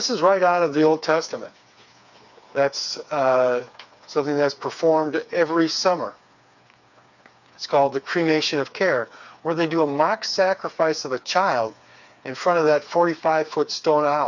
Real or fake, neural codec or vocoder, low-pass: fake; codec, 16 kHz, 4 kbps, FreqCodec, smaller model; 7.2 kHz